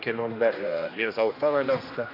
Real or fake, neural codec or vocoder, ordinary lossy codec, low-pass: fake; codec, 16 kHz, 1 kbps, X-Codec, HuBERT features, trained on general audio; AAC, 32 kbps; 5.4 kHz